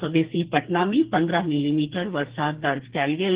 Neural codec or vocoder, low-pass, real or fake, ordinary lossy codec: codec, 44.1 kHz, 2.6 kbps, SNAC; 3.6 kHz; fake; Opus, 16 kbps